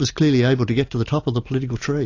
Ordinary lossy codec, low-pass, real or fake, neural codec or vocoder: AAC, 48 kbps; 7.2 kHz; real; none